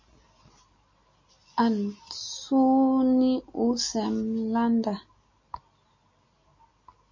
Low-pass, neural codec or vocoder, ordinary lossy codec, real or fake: 7.2 kHz; none; MP3, 32 kbps; real